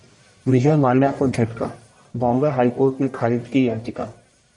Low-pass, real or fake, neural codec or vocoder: 10.8 kHz; fake; codec, 44.1 kHz, 1.7 kbps, Pupu-Codec